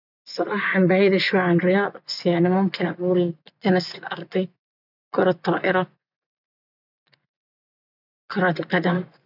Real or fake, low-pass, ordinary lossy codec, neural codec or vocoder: fake; 5.4 kHz; none; vocoder, 24 kHz, 100 mel bands, Vocos